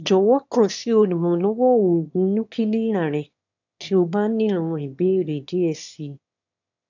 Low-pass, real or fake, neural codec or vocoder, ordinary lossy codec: 7.2 kHz; fake; autoencoder, 22.05 kHz, a latent of 192 numbers a frame, VITS, trained on one speaker; none